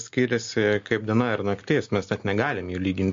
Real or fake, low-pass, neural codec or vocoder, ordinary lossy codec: real; 7.2 kHz; none; MP3, 48 kbps